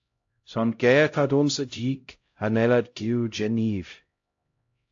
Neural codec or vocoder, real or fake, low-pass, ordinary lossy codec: codec, 16 kHz, 0.5 kbps, X-Codec, HuBERT features, trained on LibriSpeech; fake; 7.2 kHz; AAC, 48 kbps